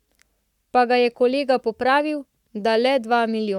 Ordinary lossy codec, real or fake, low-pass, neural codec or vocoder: none; fake; 19.8 kHz; codec, 44.1 kHz, 7.8 kbps, Pupu-Codec